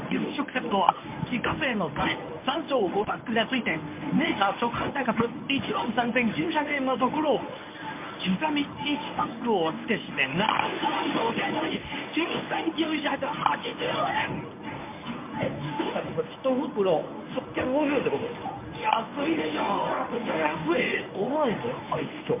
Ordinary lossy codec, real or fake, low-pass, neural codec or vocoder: MP3, 32 kbps; fake; 3.6 kHz; codec, 24 kHz, 0.9 kbps, WavTokenizer, medium speech release version 1